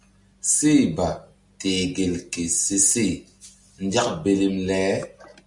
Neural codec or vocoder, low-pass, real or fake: none; 10.8 kHz; real